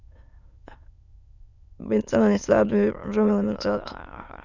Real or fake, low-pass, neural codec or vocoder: fake; 7.2 kHz; autoencoder, 22.05 kHz, a latent of 192 numbers a frame, VITS, trained on many speakers